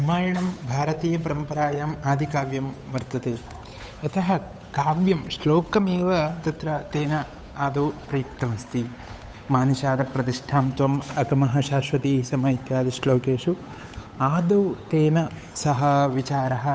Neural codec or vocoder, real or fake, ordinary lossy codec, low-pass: codec, 16 kHz, 8 kbps, FunCodec, trained on Chinese and English, 25 frames a second; fake; none; none